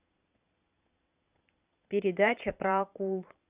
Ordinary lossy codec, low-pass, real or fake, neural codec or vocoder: Opus, 64 kbps; 3.6 kHz; fake; vocoder, 22.05 kHz, 80 mel bands, Vocos